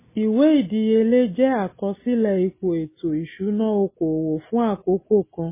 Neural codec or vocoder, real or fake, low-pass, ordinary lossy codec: none; real; 3.6 kHz; MP3, 16 kbps